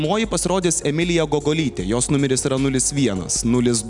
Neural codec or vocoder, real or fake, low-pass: none; real; 10.8 kHz